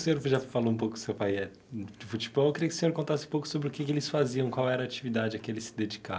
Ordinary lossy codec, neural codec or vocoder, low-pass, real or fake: none; none; none; real